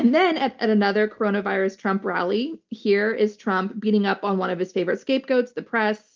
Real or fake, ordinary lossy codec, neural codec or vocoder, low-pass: real; Opus, 24 kbps; none; 7.2 kHz